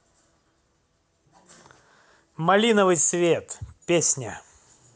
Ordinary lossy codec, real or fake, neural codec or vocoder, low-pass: none; real; none; none